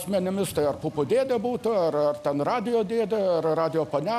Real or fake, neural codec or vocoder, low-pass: real; none; 14.4 kHz